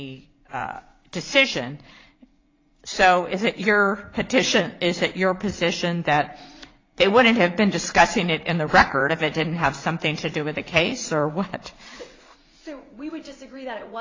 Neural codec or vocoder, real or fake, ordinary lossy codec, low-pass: vocoder, 44.1 kHz, 80 mel bands, Vocos; fake; AAC, 32 kbps; 7.2 kHz